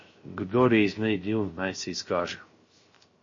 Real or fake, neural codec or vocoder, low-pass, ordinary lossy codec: fake; codec, 16 kHz, 0.3 kbps, FocalCodec; 7.2 kHz; MP3, 32 kbps